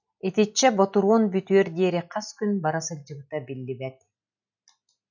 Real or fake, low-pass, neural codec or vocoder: real; 7.2 kHz; none